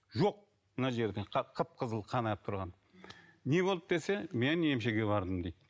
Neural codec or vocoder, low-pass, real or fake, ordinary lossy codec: none; none; real; none